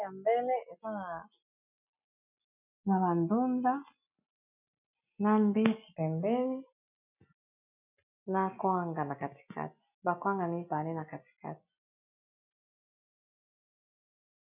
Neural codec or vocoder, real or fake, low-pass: none; real; 3.6 kHz